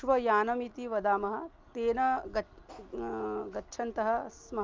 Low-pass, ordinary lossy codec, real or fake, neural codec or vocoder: 7.2 kHz; Opus, 24 kbps; real; none